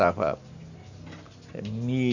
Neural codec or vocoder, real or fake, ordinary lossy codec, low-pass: none; real; none; 7.2 kHz